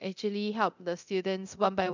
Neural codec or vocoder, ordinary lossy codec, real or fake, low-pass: codec, 24 kHz, 0.9 kbps, DualCodec; none; fake; 7.2 kHz